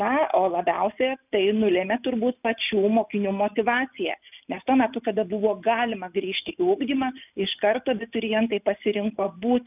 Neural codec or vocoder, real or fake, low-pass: none; real; 3.6 kHz